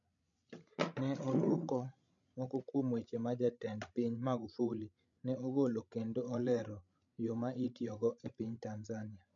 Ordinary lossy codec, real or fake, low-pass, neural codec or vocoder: none; fake; 7.2 kHz; codec, 16 kHz, 16 kbps, FreqCodec, larger model